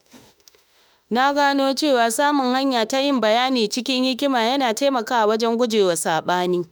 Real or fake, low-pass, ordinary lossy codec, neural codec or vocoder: fake; none; none; autoencoder, 48 kHz, 32 numbers a frame, DAC-VAE, trained on Japanese speech